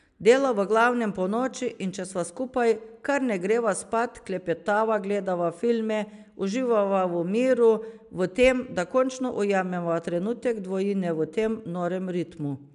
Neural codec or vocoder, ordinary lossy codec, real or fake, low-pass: none; AAC, 96 kbps; real; 10.8 kHz